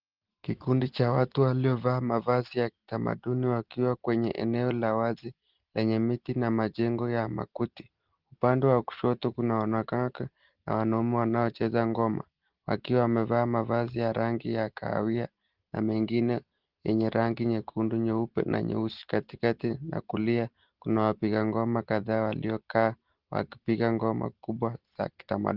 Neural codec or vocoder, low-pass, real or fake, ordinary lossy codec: none; 5.4 kHz; real; Opus, 16 kbps